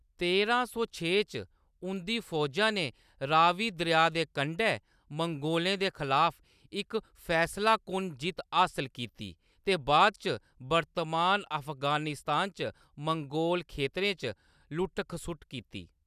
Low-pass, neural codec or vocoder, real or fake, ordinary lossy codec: 14.4 kHz; none; real; none